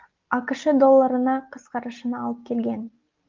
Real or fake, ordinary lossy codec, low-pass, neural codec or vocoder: real; Opus, 32 kbps; 7.2 kHz; none